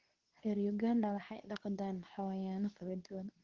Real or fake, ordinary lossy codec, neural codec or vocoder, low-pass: fake; Opus, 16 kbps; codec, 24 kHz, 0.9 kbps, WavTokenizer, medium speech release version 2; 7.2 kHz